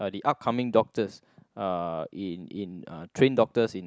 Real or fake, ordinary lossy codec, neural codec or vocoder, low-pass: real; none; none; none